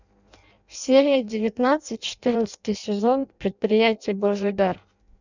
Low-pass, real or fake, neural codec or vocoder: 7.2 kHz; fake; codec, 16 kHz in and 24 kHz out, 0.6 kbps, FireRedTTS-2 codec